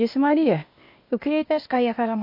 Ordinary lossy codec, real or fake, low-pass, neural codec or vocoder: MP3, 32 kbps; fake; 5.4 kHz; codec, 16 kHz, 0.8 kbps, ZipCodec